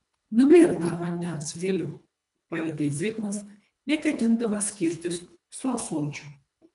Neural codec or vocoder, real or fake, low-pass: codec, 24 kHz, 1.5 kbps, HILCodec; fake; 10.8 kHz